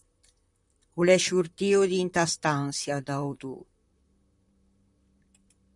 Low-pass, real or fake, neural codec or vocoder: 10.8 kHz; fake; vocoder, 44.1 kHz, 128 mel bands, Pupu-Vocoder